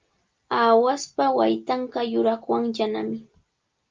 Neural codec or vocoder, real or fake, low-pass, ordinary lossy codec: none; real; 7.2 kHz; Opus, 32 kbps